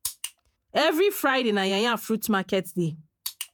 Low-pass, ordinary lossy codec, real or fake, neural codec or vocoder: none; none; fake; vocoder, 48 kHz, 128 mel bands, Vocos